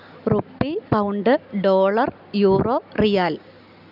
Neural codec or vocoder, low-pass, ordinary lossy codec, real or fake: none; 5.4 kHz; none; real